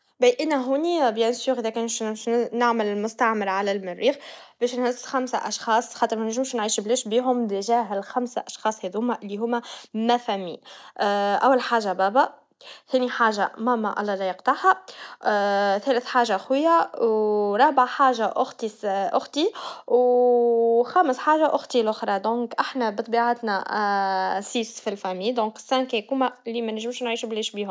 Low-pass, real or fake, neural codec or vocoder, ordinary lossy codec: none; real; none; none